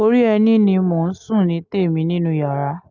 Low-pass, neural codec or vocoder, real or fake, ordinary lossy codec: 7.2 kHz; none; real; none